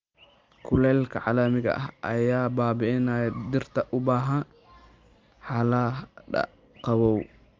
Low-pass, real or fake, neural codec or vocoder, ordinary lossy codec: 7.2 kHz; real; none; Opus, 24 kbps